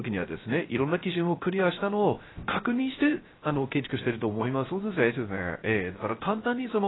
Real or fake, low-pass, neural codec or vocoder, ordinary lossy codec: fake; 7.2 kHz; codec, 16 kHz, 0.3 kbps, FocalCodec; AAC, 16 kbps